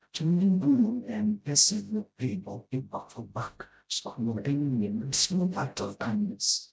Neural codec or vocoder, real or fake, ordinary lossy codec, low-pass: codec, 16 kHz, 0.5 kbps, FreqCodec, smaller model; fake; none; none